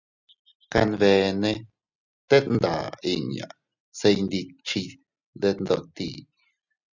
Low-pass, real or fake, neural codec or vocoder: 7.2 kHz; real; none